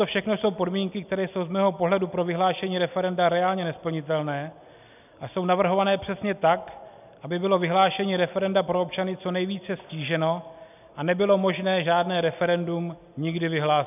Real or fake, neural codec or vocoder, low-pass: real; none; 3.6 kHz